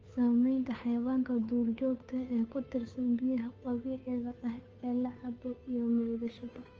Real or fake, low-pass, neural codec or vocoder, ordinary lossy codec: fake; 7.2 kHz; codec, 16 kHz, 2 kbps, FunCodec, trained on Chinese and English, 25 frames a second; Opus, 24 kbps